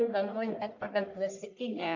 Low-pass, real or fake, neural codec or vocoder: 7.2 kHz; fake; codec, 44.1 kHz, 1.7 kbps, Pupu-Codec